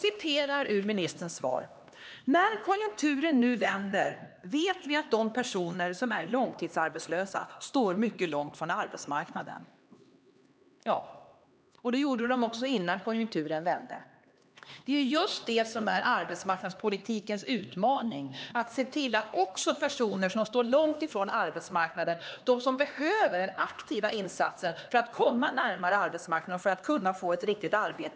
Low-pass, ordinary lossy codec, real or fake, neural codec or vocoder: none; none; fake; codec, 16 kHz, 2 kbps, X-Codec, HuBERT features, trained on LibriSpeech